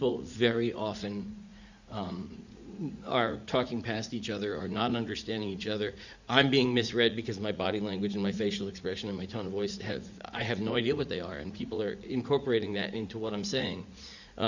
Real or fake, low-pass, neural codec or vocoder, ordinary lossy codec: fake; 7.2 kHz; vocoder, 44.1 kHz, 80 mel bands, Vocos; Opus, 64 kbps